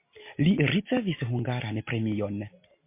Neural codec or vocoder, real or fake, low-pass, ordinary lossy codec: none; real; 3.6 kHz; MP3, 24 kbps